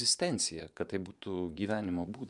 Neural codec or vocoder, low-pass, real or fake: vocoder, 24 kHz, 100 mel bands, Vocos; 10.8 kHz; fake